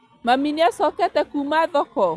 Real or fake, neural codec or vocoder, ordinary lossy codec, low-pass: real; none; none; none